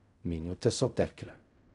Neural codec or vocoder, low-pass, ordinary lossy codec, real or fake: codec, 16 kHz in and 24 kHz out, 0.4 kbps, LongCat-Audio-Codec, fine tuned four codebook decoder; 10.8 kHz; none; fake